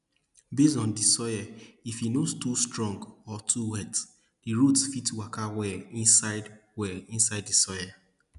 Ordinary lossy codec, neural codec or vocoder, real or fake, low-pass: none; none; real; 10.8 kHz